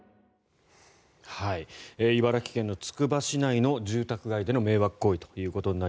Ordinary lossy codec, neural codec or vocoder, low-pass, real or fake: none; none; none; real